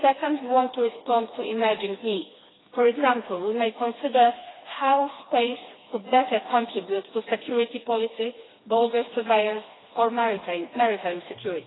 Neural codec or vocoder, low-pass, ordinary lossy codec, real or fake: codec, 16 kHz, 2 kbps, FreqCodec, smaller model; 7.2 kHz; AAC, 16 kbps; fake